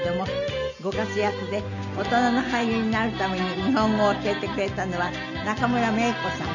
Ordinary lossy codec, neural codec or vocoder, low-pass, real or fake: none; none; 7.2 kHz; real